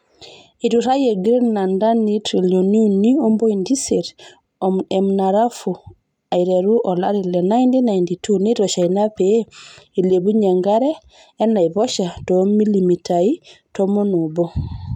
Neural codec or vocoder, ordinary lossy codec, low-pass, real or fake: none; none; 19.8 kHz; real